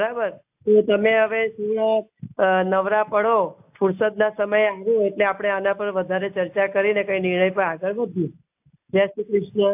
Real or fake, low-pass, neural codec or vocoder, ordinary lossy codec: real; 3.6 kHz; none; AAC, 32 kbps